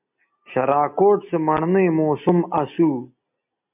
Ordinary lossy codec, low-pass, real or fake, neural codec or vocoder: MP3, 32 kbps; 3.6 kHz; real; none